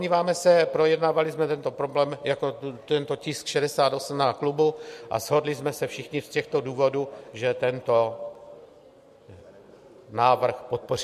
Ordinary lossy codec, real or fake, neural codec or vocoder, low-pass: MP3, 64 kbps; real; none; 14.4 kHz